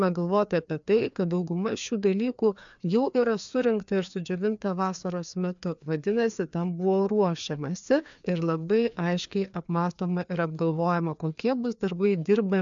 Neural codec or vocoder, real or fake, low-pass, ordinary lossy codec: codec, 16 kHz, 2 kbps, FreqCodec, larger model; fake; 7.2 kHz; MP3, 64 kbps